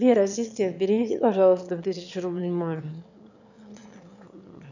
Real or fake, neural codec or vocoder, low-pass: fake; autoencoder, 22.05 kHz, a latent of 192 numbers a frame, VITS, trained on one speaker; 7.2 kHz